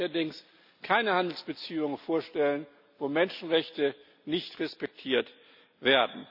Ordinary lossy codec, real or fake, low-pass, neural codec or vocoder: none; real; 5.4 kHz; none